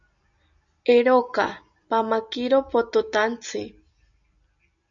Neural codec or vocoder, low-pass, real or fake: none; 7.2 kHz; real